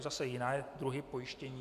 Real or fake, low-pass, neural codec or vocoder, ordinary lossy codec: real; 14.4 kHz; none; MP3, 96 kbps